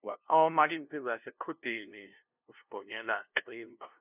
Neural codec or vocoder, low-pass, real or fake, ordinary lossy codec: codec, 16 kHz, 0.5 kbps, FunCodec, trained on LibriTTS, 25 frames a second; 3.6 kHz; fake; none